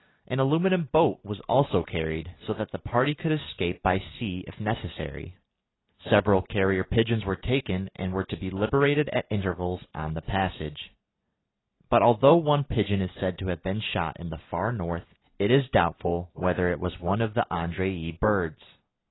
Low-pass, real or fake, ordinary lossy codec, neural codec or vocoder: 7.2 kHz; real; AAC, 16 kbps; none